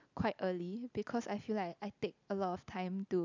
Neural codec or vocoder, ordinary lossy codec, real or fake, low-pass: none; none; real; 7.2 kHz